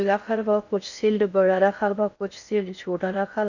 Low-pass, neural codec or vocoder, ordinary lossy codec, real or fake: 7.2 kHz; codec, 16 kHz in and 24 kHz out, 0.6 kbps, FocalCodec, streaming, 4096 codes; none; fake